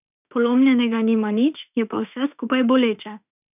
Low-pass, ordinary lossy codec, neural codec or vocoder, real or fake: 3.6 kHz; none; autoencoder, 48 kHz, 32 numbers a frame, DAC-VAE, trained on Japanese speech; fake